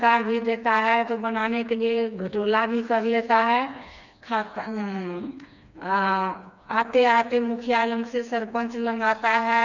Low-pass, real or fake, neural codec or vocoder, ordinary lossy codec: 7.2 kHz; fake; codec, 16 kHz, 2 kbps, FreqCodec, smaller model; none